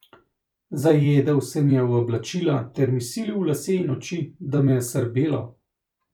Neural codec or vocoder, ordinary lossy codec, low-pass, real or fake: vocoder, 44.1 kHz, 128 mel bands every 256 samples, BigVGAN v2; none; 19.8 kHz; fake